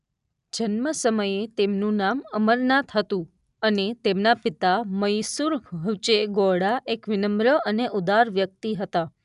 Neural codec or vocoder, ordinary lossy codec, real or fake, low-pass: none; none; real; 10.8 kHz